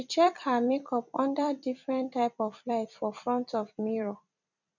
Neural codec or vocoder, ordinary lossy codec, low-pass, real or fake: vocoder, 44.1 kHz, 128 mel bands every 256 samples, BigVGAN v2; none; 7.2 kHz; fake